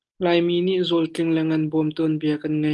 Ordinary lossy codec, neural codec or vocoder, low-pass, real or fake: Opus, 24 kbps; none; 10.8 kHz; real